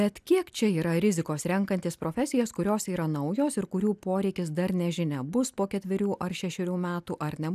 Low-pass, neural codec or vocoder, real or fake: 14.4 kHz; none; real